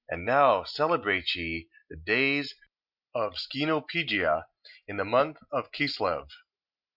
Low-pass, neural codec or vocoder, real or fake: 5.4 kHz; none; real